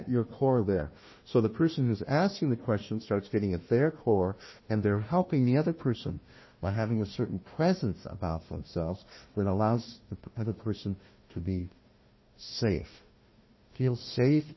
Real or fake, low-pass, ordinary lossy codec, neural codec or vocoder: fake; 7.2 kHz; MP3, 24 kbps; codec, 16 kHz, 1 kbps, FunCodec, trained on Chinese and English, 50 frames a second